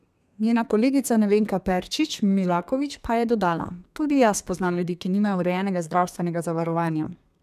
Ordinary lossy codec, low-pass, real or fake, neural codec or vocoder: none; 14.4 kHz; fake; codec, 32 kHz, 1.9 kbps, SNAC